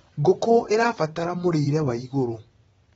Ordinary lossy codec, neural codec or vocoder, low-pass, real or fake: AAC, 24 kbps; vocoder, 22.05 kHz, 80 mel bands, WaveNeXt; 9.9 kHz; fake